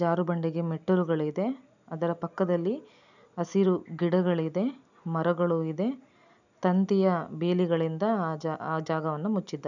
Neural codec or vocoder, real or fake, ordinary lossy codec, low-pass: none; real; none; 7.2 kHz